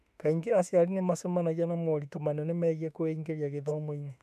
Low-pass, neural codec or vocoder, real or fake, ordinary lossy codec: 14.4 kHz; autoencoder, 48 kHz, 32 numbers a frame, DAC-VAE, trained on Japanese speech; fake; none